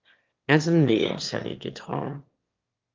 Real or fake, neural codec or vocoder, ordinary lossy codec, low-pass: fake; autoencoder, 22.05 kHz, a latent of 192 numbers a frame, VITS, trained on one speaker; Opus, 32 kbps; 7.2 kHz